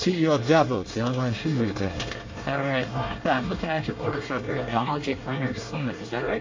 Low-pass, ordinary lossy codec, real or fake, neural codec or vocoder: 7.2 kHz; MP3, 48 kbps; fake; codec, 24 kHz, 1 kbps, SNAC